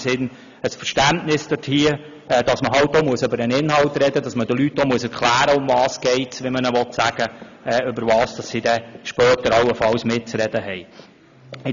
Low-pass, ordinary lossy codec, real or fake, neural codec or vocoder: 7.2 kHz; none; real; none